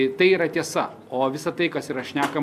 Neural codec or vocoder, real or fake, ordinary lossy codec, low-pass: none; real; MP3, 96 kbps; 14.4 kHz